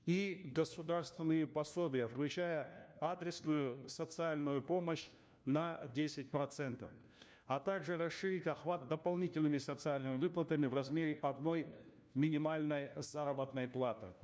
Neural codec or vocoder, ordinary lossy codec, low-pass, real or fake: codec, 16 kHz, 1 kbps, FunCodec, trained on LibriTTS, 50 frames a second; none; none; fake